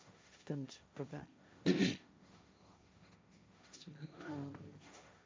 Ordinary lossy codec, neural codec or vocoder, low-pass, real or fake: none; codec, 16 kHz, 1.1 kbps, Voila-Tokenizer; none; fake